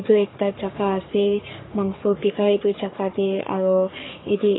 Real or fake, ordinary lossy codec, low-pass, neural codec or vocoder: fake; AAC, 16 kbps; 7.2 kHz; codec, 44.1 kHz, 3.4 kbps, Pupu-Codec